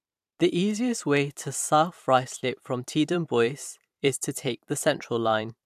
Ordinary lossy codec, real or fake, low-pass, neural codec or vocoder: AAC, 96 kbps; fake; 14.4 kHz; vocoder, 44.1 kHz, 128 mel bands every 512 samples, BigVGAN v2